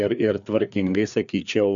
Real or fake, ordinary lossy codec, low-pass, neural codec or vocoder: fake; MP3, 96 kbps; 7.2 kHz; codec, 16 kHz, 4 kbps, FreqCodec, larger model